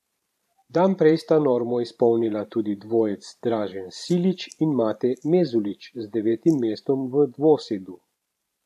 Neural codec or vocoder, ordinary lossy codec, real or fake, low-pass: vocoder, 44.1 kHz, 128 mel bands every 512 samples, BigVGAN v2; AAC, 96 kbps; fake; 14.4 kHz